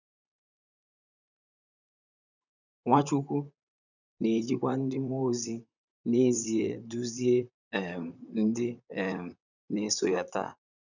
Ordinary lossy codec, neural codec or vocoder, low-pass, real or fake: none; vocoder, 44.1 kHz, 128 mel bands, Pupu-Vocoder; 7.2 kHz; fake